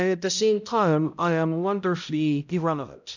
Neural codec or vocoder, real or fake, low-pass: codec, 16 kHz, 0.5 kbps, X-Codec, HuBERT features, trained on balanced general audio; fake; 7.2 kHz